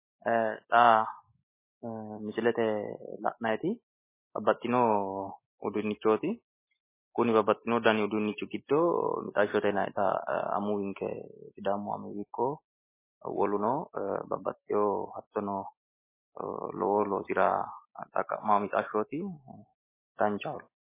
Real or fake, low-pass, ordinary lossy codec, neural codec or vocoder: real; 3.6 kHz; MP3, 16 kbps; none